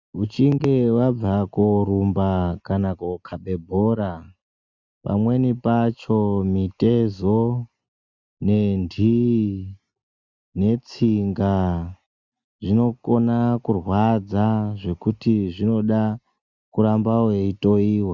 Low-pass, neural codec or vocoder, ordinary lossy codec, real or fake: 7.2 kHz; none; Opus, 64 kbps; real